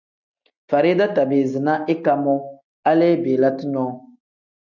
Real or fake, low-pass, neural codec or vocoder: real; 7.2 kHz; none